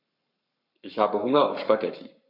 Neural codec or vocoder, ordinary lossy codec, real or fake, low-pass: codec, 44.1 kHz, 7.8 kbps, Pupu-Codec; none; fake; 5.4 kHz